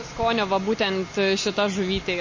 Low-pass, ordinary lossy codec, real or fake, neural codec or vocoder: 7.2 kHz; MP3, 32 kbps; real; none